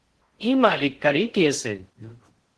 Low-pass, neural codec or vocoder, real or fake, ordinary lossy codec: 10.8 kHz; codec, 16 kHz in and 24 kHz out, 0.6 kbps, FocalCodec, streaming, 4096 codes; fake; Opus, 16 kbps